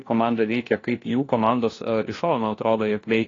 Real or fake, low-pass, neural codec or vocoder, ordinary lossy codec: fake; 7.2 kHz; codec, 16 kHz, 1 kbps, FunCodec, trained on LibriTTS, 50 frames a second; AAC, 32 kbps